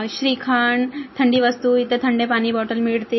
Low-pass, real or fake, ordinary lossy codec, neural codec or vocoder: 7.2 kHz; real; MP3, 24 kbps; none